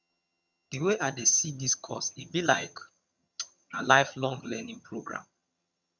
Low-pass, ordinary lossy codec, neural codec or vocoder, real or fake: 7.2 kHz; none; vocoder, 22.05 kHz, 80 mel bands, HiFi-GAN; fake